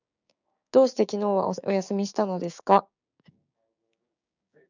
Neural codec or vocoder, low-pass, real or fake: codec, 16 kHz, 6 kbps, DAC; 7.2 kHz; fake